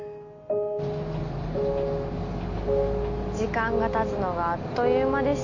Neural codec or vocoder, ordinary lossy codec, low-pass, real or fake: none; MP3, 48 kbps; 7.2 kHz; real